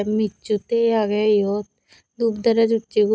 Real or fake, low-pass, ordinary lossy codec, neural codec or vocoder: real; none; none; none